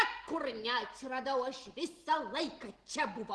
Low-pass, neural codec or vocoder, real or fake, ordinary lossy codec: 10.8 kHz; none; real; Opus, 16 kbps